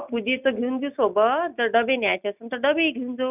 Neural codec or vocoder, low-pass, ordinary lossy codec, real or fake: none; 3.6 kHz; none; real